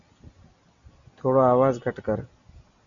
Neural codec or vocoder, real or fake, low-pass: none; real; 7.2 kHz